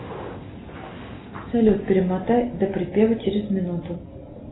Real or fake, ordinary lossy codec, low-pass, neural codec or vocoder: real; AAC, 16 kbps; 7.2 kHz; none